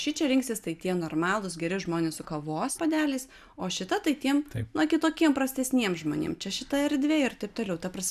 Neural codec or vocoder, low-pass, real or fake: none; 14.4 kHz; real